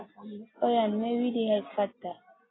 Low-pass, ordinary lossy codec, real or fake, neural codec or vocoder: 7.2 kHz; AAC, 16 kbps; real; none